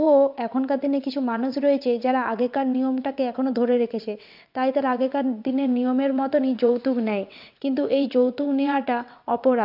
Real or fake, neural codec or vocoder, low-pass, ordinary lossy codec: fake; vocoder, 22.05 kHz, 80 mel bands, WaveNeXt; 5.4 kHz; MP3, 48 kbps